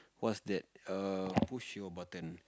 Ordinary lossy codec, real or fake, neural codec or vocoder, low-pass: none; real; none; none